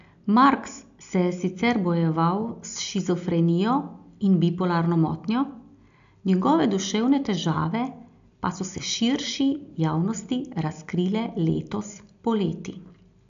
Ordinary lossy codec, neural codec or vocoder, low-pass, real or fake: AAC, 64 kbps; none; 7.2 kHz; real